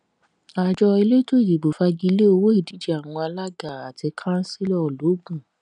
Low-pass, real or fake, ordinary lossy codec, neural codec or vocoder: 9.9 kHz; real; none; none